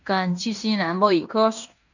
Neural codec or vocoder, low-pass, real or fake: codec, 16 kHz in and 24 kHz out, 0.9 kbps, LongCat-Audio-Codec, fine tuned four codebook decoder; 7.2 kHz; fake